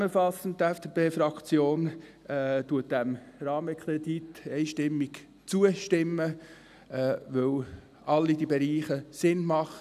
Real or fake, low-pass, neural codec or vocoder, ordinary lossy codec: real; 14.4 kHz; none; none